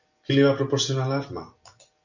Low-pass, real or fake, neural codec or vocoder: 7.2 kHz; real; none